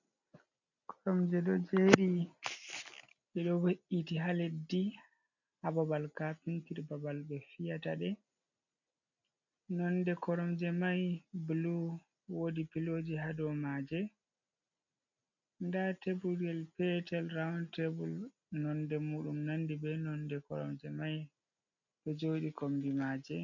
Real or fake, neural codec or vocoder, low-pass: real; none; 7.2 kHz